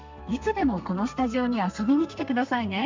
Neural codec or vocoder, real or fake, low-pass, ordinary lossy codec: codec, 32 kHz, 1.9 kbps, SNAC; fake; 7.2 kHz; MP3, 64 kbps